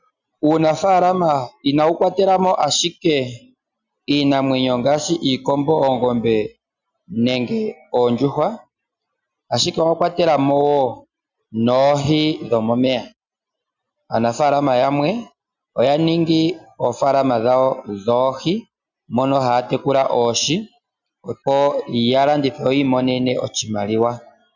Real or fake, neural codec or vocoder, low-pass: real; none; 7.2 kHz